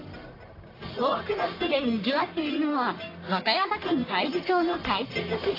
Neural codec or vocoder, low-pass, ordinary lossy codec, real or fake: codec, 44.1 kHz, 1.7 kbps, Pupu-Codec; 5.4 kHz; AAC, 24 kbps; fake